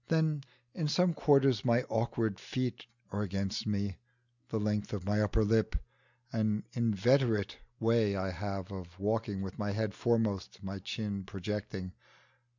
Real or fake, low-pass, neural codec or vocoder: real; 7.2 kHz; none